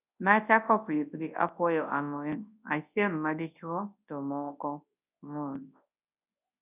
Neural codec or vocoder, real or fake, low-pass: codec, 24 kHz, 0.9 kbps, WavTokenizer, large speech release; fake; 3.6 kHz